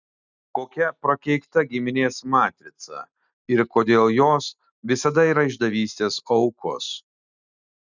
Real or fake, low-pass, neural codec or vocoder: real; 7.2 kHz; none